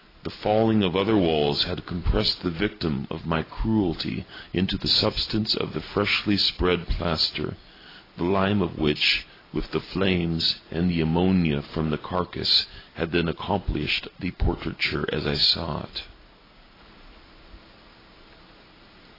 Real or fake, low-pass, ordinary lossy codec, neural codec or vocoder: real; 5.4 kHz; AAC, 24 kbps; none